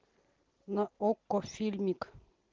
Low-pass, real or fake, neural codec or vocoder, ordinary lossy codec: 7.2 kHz; real; none; Opus, 16 kbps